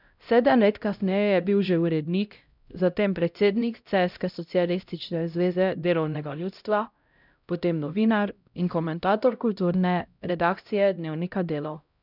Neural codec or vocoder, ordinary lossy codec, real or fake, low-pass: codec, 16 kHz, 0.5 kbps, X-Codec, HuBERT features, trained on LibriSpeech; none; fake; 5.4 kHz